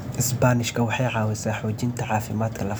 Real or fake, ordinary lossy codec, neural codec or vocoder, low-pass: real; none; none; none